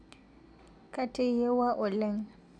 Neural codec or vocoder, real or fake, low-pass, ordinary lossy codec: none; real; 9.9 kHz; none